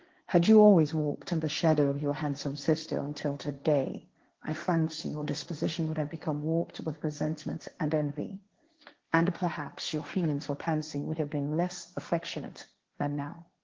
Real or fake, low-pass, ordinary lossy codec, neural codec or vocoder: fake; 7.2 kHz; Opus, 16 kbps; codec, 16 kHz, 1.1 kbps, Voila-Tokenizer